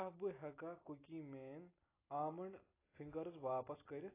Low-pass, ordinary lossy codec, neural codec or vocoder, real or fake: 3.6 kHz; AAC, 16 kbps; none; real